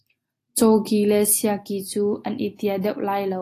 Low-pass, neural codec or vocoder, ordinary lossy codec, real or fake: 14.4 kHz; none; AAC, 48 kbps; real